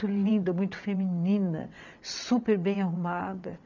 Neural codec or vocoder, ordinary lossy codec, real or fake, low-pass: vocoder, 22.05 kHz, 80 mel bands, WaveNeXt; none; fake; 7.2 kHz